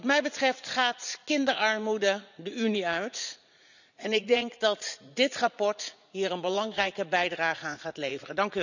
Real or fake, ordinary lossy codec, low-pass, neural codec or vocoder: fake; none; 7.2 kHz; vocoder, 44.1 kHz, 80 mel bands, Vocos